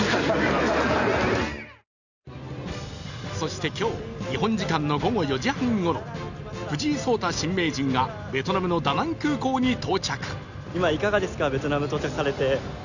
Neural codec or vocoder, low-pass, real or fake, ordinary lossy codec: none; 7.2 kHz; real; none